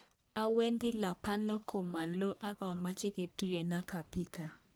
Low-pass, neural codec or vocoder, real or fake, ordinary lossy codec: none; codec, 44.1 kHz, 1.7 kbps, Pupu-Codec; fake; none